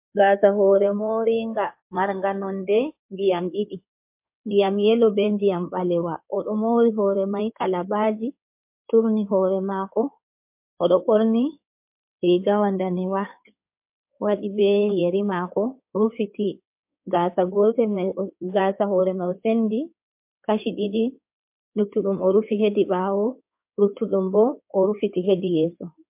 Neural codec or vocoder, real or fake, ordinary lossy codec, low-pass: codec, 16 kHz in and 24 kHz out, 2.2 kbps, FireRedTTS-2 codec; fake; MP3, 32 kbps; 3.6 kHz